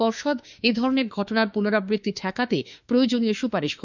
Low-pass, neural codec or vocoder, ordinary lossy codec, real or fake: 7.2 kHz; autoencoder, 48 kHz, 32 numbers a frame, DAC-VAE, trained on Japanese speech; none; fake